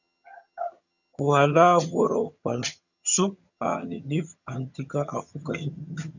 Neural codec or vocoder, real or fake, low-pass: vocoder, 22.05 kHz, 80 mel bands, HiFi-GAN; fake; 7.2 kHz